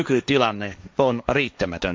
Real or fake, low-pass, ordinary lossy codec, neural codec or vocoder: fake; none; none; codec, 16 kHz, 1.1 kbps, Voila-Tokenizer